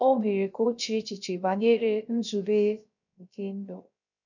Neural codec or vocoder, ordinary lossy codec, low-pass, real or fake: codec, 16 kHz, 0.3 kbps, FocalCodec; none; 7.2 kHz; fake